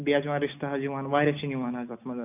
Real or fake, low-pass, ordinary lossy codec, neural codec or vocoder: real; 3.6 kHz; none; none